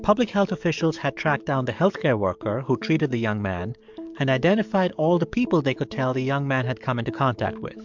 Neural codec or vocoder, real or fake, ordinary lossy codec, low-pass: codec, 44.1 kHz, 7.8 kbps, DAC; fake; MP3, 64 kbps; 7.2 kHz